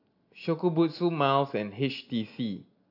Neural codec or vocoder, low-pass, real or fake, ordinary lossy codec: none; 5.4 kHz; real; AAC, 48 kbps